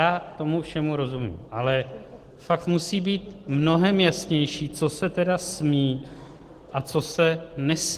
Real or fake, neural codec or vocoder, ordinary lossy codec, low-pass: real; none; Opus, 16 kbps; 14.4 kHz